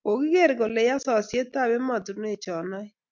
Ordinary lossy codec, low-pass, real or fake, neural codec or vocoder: MP3, 64 kbps; 7.2 kHz; real; none